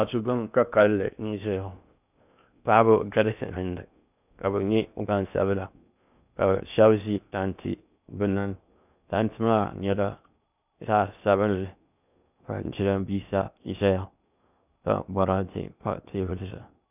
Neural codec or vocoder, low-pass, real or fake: codec, 16 kHz in and 24 kHz out, 0.6 kbps, FocalCodec, streaming, 2048 codes; 3.6 kHz; fake